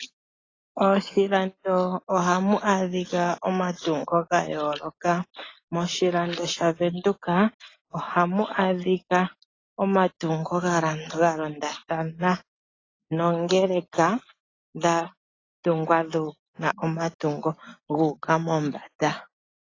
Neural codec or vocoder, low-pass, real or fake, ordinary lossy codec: none; 7.2 kHz; real; AAC, 32 kbps